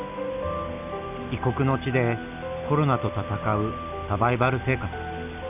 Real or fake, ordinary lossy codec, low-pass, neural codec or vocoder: real; none; 3.6 kHz; none